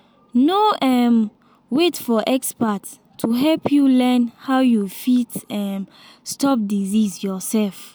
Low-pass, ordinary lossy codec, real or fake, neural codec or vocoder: none; none; real; none